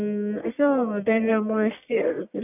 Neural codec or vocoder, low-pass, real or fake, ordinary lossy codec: codec, 44.1 kHz, 1.7 kbps, Pupu-Codec; 3.6 kHz; fake; none